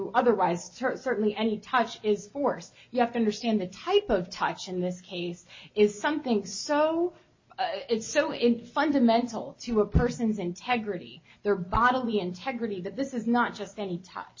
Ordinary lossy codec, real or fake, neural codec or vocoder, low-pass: MP3, 32 kbps; real; none; 7.2 kHz